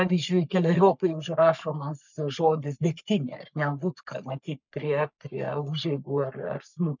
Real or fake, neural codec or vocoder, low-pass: fake; codec, 44.1 kHz, 3.4 kbps, Pupu-Codec; 7.2 kHz